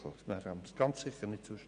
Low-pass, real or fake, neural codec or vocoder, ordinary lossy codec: 9.9 kHz; real; none; none